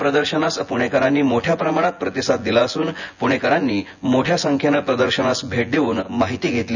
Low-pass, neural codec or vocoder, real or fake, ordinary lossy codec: 7.2 kHz; vocoder, 24 kHz, 100 mel bands, Vocos; fake; none